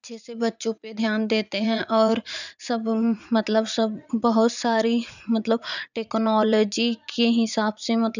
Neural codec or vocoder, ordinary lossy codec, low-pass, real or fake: vocoder, 44.1 kHz, 80 mel bands, Vocos; none; 7.2 kHz; fake